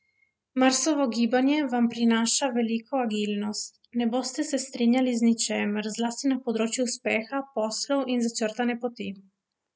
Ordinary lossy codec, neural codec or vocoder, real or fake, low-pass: none; none; real; none